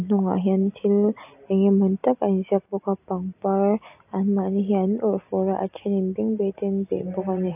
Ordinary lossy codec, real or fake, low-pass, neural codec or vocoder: AAC, 32 kbps; real; 3.6 kHz; none